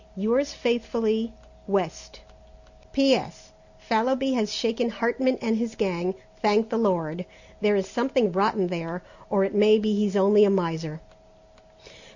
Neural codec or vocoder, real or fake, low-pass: none; real; 7.2 kHz